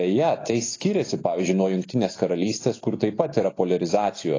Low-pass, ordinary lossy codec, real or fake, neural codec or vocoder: 7.2 kHz; AAC, 32 kbps; real; none